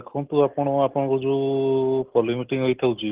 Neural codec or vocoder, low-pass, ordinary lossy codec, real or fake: none; 3.6 kHz; Opus, 16 kbps; real